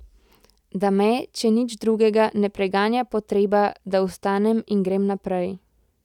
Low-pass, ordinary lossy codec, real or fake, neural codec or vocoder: 19.8 kHz; none; real; none